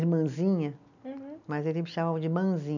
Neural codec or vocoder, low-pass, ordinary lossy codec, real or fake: none; 7.2 kHz; none; real